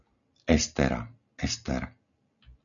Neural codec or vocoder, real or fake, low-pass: none; real; 7.2 kHz